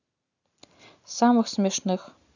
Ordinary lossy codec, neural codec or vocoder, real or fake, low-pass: none; none; real; 7.2 kHz